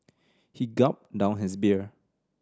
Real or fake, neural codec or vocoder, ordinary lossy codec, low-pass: real; none; none; none